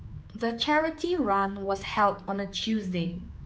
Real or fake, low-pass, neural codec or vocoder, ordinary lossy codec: fake; none; codec, 16 kHz, 4 kbps, X-Codec, HuBERT features, trained on general audio; none